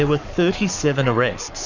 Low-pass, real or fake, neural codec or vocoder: 7.2 kHz; fake; codec, 44.1 kHz, 7.8 kbps, Pupu-Codec